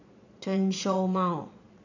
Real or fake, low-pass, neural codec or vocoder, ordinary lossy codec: fake; 7.2 kHz; vocoder, 22.05 kHz, 80 mel bands, Vocos; none